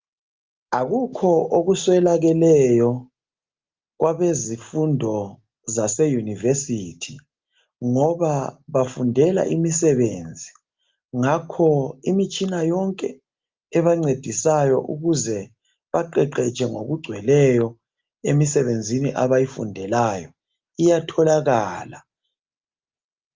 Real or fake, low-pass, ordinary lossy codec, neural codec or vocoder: real; 7.2 kHz; Opus, 32 kbps; none